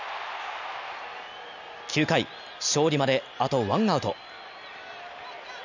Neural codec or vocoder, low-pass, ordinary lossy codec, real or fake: none; 7.2 kHz; none; real